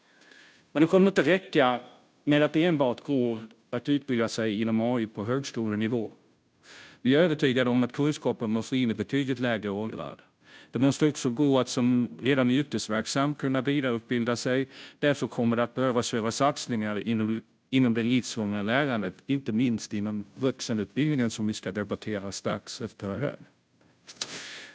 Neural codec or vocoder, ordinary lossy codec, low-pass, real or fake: codec, 16 kHz, 0.5 kbps, FunCodec, trained on Chinese and English, 25 frames a second; none; none; fake